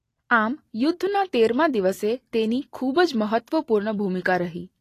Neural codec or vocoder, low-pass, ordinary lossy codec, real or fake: none; 14.4 kHz; AAC, 48 kbps; real